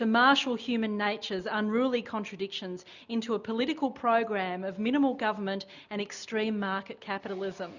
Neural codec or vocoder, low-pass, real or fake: none; 7.2 kHz; real